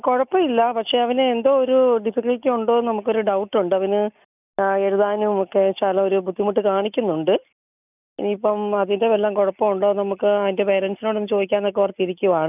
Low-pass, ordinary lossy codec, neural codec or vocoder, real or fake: 3.6 kHz; none; none; real